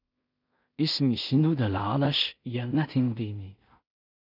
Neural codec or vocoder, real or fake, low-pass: codec, 16 kHz in and 24 kHz out, 0.4 kbps, LongCat-Audio-Codec, two codebook decoder; fake; 5.4 kHz